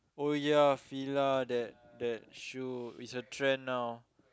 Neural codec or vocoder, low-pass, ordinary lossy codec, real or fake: none; none; none; real